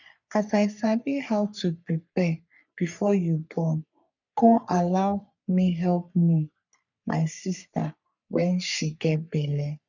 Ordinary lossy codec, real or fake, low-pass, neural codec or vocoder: none; fake; 7.2 kHz; codec, 44.1 kHz, 3.4 kbps, Pupu-Codec